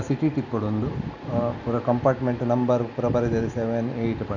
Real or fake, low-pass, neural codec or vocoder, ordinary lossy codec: real; 7.2 kHz; none; none